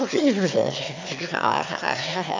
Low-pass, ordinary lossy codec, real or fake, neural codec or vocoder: 7.2 kHz; MP3, 64 kbps; fake; autoencoder, 22.05 kHz, a latent of 192 numbers a frame, VITS, trained on one speaker